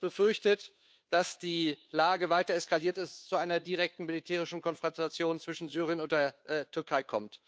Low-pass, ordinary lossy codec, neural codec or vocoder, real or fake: none; none; codec, 16 kHz, 2 kbps, FunCodec, trained on Chinese and English, 25 frames a second; fake